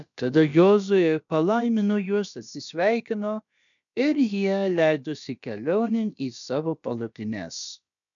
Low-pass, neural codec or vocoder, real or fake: 7.2 kHz; codec, 16 kHz, about 1 kbps, DyCAST, with the encoder's durations; fake